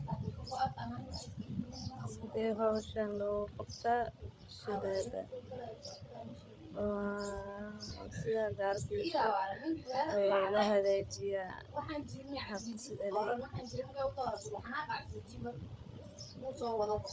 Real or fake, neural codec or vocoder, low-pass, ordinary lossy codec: fake; codec, 16 kHz, 8 kbps, FreqCodec, larger model; none; none